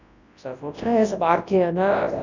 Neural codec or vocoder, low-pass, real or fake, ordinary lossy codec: codec, 24 kHz, 0.9 kbps, WavTokenizer, large speech release; 7.2 kHz; fake; none